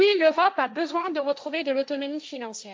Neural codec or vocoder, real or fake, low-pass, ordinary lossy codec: codec, 16 kHz, 1.1 kbps, Voila-Tokenizer; fake; none; none